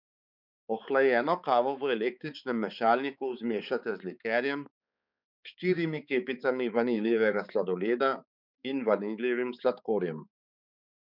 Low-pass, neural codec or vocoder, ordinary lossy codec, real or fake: 5.4 kHz; codec, 16 kHz, 4 kbps, X-Codec, HuBERT features, trained on balanced general audio; none; fake